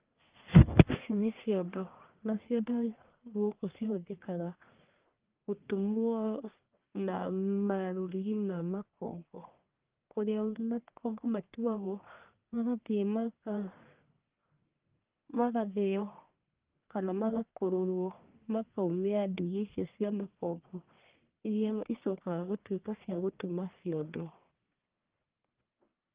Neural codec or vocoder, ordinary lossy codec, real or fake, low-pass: codec, 44.1 kHz, 1.7 kbps, Pupu-Codec; Opus, 24 kbps; fake; 3.6 kHz